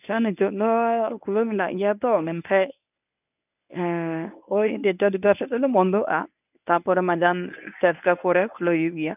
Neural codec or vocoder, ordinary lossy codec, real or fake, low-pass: codec, 24 kHz, 0.9 kbps, WavTokenizer, medium speech release version 1; none; fake; 3.6 kHz